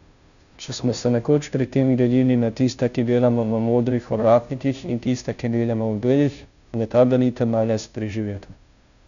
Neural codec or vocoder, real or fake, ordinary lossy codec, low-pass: codec, 16 kHz, 0.5 kbps, FunCodec, trained on Chinese and English, 25 frames a second; fake; none; 7.2 kHz